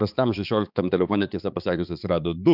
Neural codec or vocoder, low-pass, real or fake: codec, 16 kHz, 4 kbps, X-Codec, HuBERT features, trained on balanced general audio; 5.4 kHz; fake